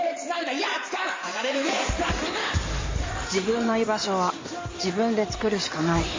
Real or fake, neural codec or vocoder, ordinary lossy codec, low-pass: fake; codec, 16 kHz in and 24 kHz out, 2.2 kbps, FireRedTTS-2 codec; MP3, 32 kbps; 7.2 kHz